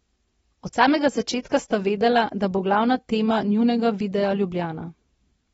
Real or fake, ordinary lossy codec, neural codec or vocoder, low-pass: real; AAC, 24 kbps; none; 19.8 kHz